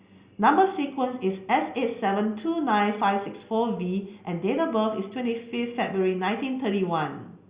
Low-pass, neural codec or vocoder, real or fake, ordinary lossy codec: 3.6 kHz; none; real; Opus, 64 kbps